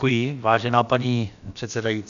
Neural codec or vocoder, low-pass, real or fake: codec, 16 kHz, about 1 kbps, DyCAST, with the encoder's durations; 7.2 kHz; fake